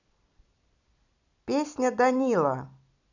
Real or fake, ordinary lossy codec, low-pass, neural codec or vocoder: fake; none; 7.2 kHz; vocoder, 44.1 kHz, 128 mel bands every 256 samples, BigVGAN v2